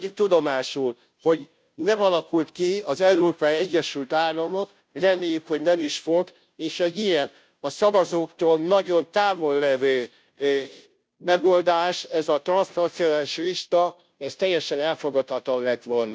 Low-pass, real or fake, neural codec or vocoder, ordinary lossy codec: none; fake; codec, 16 kHz, 0.5 kbps, FunCodec, trained on Chinese and English, 25 frames a second; none